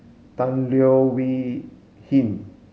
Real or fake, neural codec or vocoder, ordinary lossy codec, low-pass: real; none; none; none